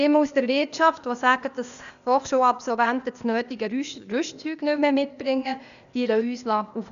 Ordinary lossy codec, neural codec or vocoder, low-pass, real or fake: none; codec, 16 kHz, 0.8 kbps, ZipCodec; 7.2 kHz; fake